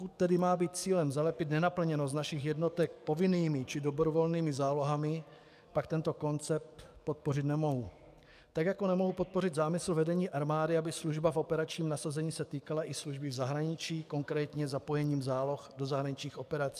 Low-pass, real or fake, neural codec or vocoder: 14.4 kHz; fake; codec, 44.1 kHz, 7.8 kbps, DAC